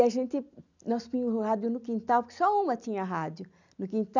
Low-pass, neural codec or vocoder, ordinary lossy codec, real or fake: 7.2 kHz; none; none; real